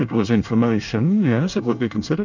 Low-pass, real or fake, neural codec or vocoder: 7.2 kHz; fake; codec, 24 kHz, 1 kbps, SNAC